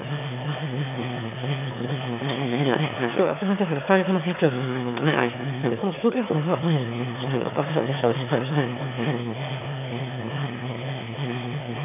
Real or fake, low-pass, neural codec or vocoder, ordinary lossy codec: fake; 3.6 kHz; autoencoder, 22.05 kHz, a latent of 192 numbers a frame, VITS, trained on one speaker; none